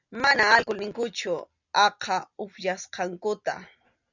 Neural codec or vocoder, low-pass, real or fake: none; 7.2 kHz; real